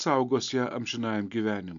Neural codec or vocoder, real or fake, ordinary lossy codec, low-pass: none; real; AAC, 64 kbps; 7.2 kHz